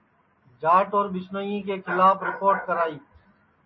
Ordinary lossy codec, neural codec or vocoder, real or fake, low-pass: MP3, 24 kbps; none; real; 7.2 kHz